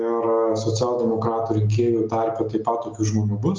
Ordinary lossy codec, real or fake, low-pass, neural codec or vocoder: Opus, 32 kbps; real; 7.2 kHz; none